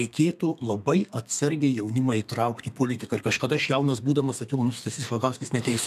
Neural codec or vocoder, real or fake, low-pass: codec, 32 kHz, 1.9 kbps, SNAC; fake; 14.4 kHz